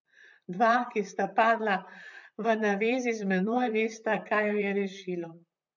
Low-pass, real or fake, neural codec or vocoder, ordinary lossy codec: 7.2 kHz; fake; vocoder, 44.1 kHz, 128 mel bands, Pupu-Vocoder; none